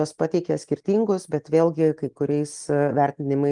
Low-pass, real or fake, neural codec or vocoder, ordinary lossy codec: 10.8 kHz; real; none; Opus, 24 kbps